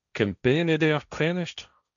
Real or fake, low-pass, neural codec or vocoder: fake; 7.2 kHz; codec, 16 kHz, 1.1 kbps, Voila-Tokenizer